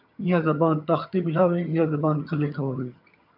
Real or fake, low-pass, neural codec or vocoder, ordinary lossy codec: fake; 5.4 kHz; vocoder, 22.05 kHz, 80 mel bands, HiFi-GAN; MP3, 48 kbps